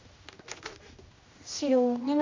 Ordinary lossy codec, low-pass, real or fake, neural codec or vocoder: MP3, 48 kbps; 7.2 kHz; fake; codec, 16 kHz, 1 kbps, X-Codec, HuBERT features, trained on general audio